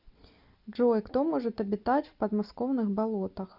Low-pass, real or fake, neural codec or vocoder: 5.4 kHz; real; none